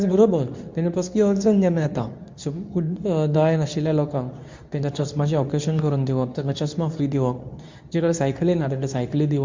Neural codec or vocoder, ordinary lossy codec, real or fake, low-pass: codec, 24 kHz, 0.9 kbps, WavTokenizer, medium speech release version 2; MP3, 64 kbps; fake; 7.2 kHz